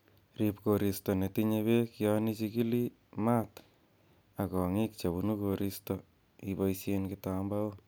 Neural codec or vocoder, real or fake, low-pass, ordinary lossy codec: none; real; none; none